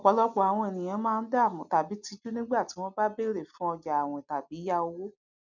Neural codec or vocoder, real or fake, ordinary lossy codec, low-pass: none; real; none; 7.2 kHz